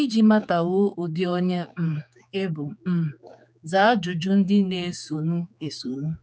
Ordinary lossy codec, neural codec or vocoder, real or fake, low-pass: none; codec, 16 kHz, 4 kbps, X-Codec, HuBERT features, trained on general audio; fake; none